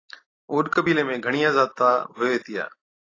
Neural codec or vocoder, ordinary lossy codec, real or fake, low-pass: none; AAC, 32 kbps; real; 7.2 kHz